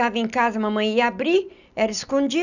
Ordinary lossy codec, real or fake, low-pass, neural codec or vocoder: none; real; 7.2 kHz; none